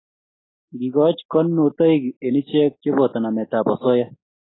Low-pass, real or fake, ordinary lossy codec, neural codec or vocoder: 7.2 kHz; real; AAC, 16 kbps; none